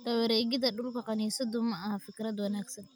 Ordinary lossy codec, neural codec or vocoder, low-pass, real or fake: none; none; none; real